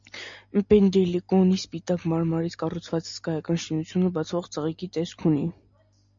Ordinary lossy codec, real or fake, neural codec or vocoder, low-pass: MP3, 64 kbps; real; none; 7.2 kHz